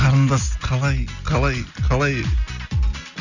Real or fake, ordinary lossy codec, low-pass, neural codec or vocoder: real; none; 7.2 kHz; none